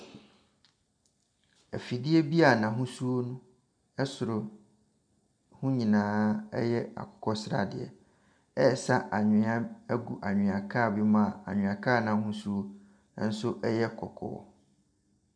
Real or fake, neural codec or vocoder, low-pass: real; none; 9.9 kHz